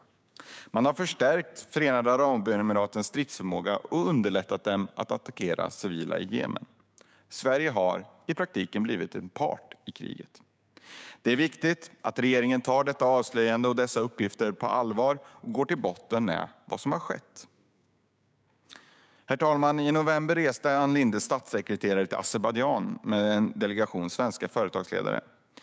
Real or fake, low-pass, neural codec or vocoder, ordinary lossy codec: fake; none; codec, 16 kHz, 6 kbps, DAC; none